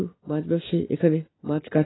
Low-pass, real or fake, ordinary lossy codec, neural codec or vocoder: 7.2 kHz; real; AAC, 16 kbps; none